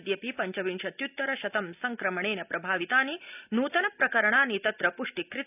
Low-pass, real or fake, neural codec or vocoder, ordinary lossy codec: 3.6 kHz; real; none; none